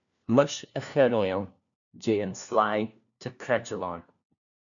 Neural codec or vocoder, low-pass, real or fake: codec, 16 kHz, 1 kbps, FunCodec, trained on LibriTTS, 50 frames a second; 7.2 kHz; fake